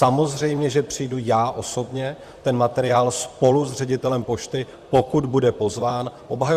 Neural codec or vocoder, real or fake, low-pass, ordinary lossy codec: vocoder, 44.1 kHz, 128 mel bands, Pupu-Vocoder; fake; 14.4 kHz; Opus, 64 kbps